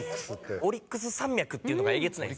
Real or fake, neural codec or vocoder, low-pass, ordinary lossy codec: real; none; none; none